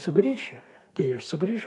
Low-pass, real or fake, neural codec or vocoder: 10.8 kHz; fake; codec, 32 kHz, 1.9 kbps, SNAC